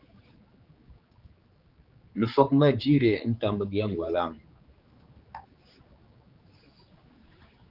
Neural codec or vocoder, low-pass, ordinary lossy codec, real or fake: codec, 16 kHz, 4 kbps, X-Codec, HuBERT features, trained on general audio; 5.4 kHz; Opus, 24 kbps; fake